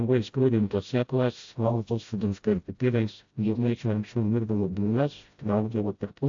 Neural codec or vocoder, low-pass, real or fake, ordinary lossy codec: codec, 16 kHz, 0.5 kbps, FreqCodec, smaller model; 7.2 kHz; fake; AAC, 48 kbps